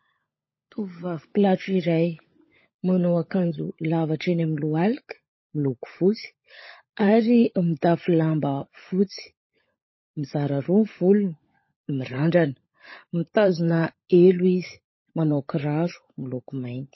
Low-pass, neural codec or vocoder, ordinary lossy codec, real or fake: 7.2 kHz; codec, 16 kHz, 16 kbps, FunCodec, trained on LibriTTS, 50 frames a second; MP3, 24 kbps; fake